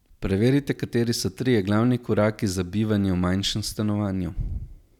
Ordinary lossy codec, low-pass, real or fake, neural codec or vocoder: none; 19.8 kHz; real; none